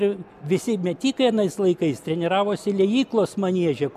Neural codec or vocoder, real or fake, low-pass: none; real; 14.4 kHz